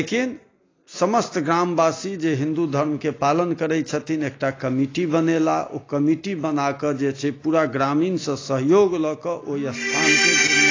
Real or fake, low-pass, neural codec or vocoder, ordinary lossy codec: real; 7.2 kHz; none; AAC, 32 kbps